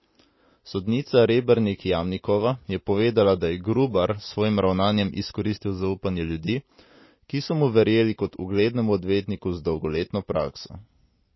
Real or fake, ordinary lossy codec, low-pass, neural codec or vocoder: real; MP3, 24 kbps; 7.2 kHz; none